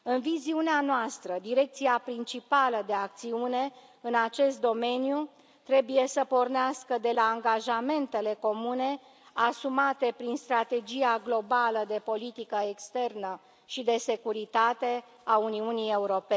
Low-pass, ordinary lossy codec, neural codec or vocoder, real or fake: none; none; none; real